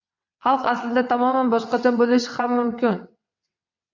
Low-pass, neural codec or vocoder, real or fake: 7.2 kHz; vocoder, 22.05 kHz, 80 mel bands, WaveNeXt; fake